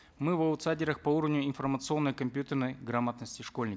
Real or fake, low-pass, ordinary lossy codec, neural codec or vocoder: real; none; none; none